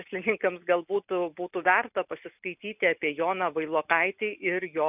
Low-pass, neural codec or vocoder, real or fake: 3.6 kHz; none; real